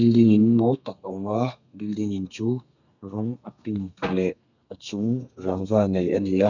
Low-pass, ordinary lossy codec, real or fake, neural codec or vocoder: 7.2 kHz; none; fake; codec, 32 kHz, 1.9 kbps, SNAC